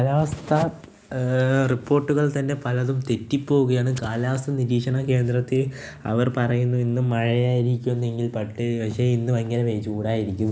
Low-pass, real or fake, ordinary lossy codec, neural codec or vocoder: none; real; none; none